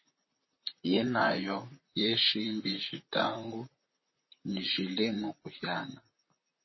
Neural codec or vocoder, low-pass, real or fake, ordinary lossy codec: vocoder, 44.1 kHz, 128 mel bands, Pupu-Vocoder; 7.2 kHz; fake; MP3, 24 kbps